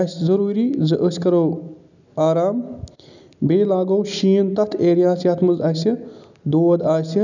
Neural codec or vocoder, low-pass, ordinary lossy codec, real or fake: none; 7.2 kHz; none; real